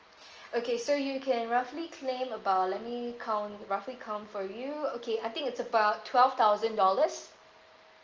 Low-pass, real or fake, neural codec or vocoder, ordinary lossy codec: 7.2 kHz; real; none; Opus, 24 kbps